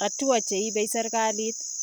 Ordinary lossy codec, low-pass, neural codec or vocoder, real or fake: none; none; none; real